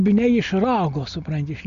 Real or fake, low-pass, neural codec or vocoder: real; 7.2 kHz; none